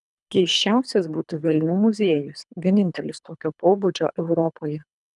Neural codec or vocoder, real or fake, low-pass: codec, 24 kHz, 3 kbps, HILCodec; fake; 10.8 kHz